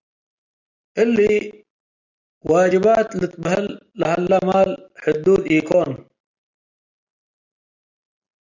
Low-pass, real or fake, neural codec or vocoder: 7.2 kHz; real; none